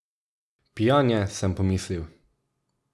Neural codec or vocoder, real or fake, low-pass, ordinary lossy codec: none; real; none; none